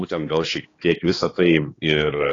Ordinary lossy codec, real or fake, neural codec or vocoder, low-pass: AAC, 32 kbps; fake; codec, 16 kHz, 2 kbps, X-Codec, HuBERT features, trained on balanced general audio; 7.2 kHz